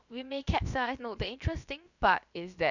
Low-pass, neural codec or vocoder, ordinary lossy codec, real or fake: 7.2 kHz; codec, 16 kHz, about 1 kbps, DyCAST, with the encoder's durations; none; fake